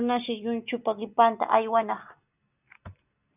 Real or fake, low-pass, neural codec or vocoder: real; 3.6 kHz; none